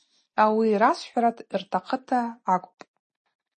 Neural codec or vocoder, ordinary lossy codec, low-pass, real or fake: none; MP3, 32 kbps; 9.9 kHz; real